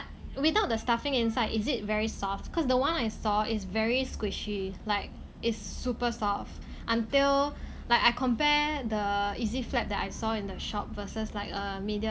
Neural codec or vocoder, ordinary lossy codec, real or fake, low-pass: none; none; real; none